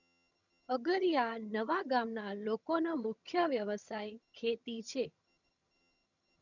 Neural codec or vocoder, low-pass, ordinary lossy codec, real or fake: vocoder, 22.05 kHz, 80 mel bands, HiFi-GAN; 7.2 kHz; none; fake